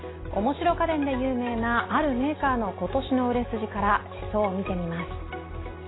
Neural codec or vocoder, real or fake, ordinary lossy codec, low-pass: none; real; AAC, 16 kbps; 7.2 kHz